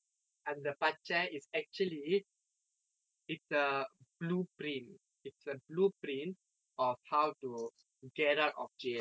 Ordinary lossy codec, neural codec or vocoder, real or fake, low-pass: none; none; real; none